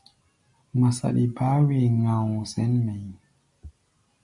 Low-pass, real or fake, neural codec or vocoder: 10.8 kHz; real; none